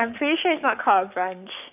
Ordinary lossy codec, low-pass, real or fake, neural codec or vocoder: none; 3.6 kHz; fake; codec, 44.1 kHz, 7.8 kbps, Pupu-Codec